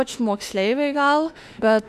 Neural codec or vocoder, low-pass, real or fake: autoencoder, 48 kHz, 32 numbers a frame, DAC-VAE, trained on Japanese speech; 14.4 kHz; fake